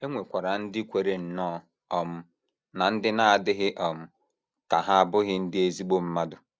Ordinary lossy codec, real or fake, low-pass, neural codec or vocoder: none; real; none; none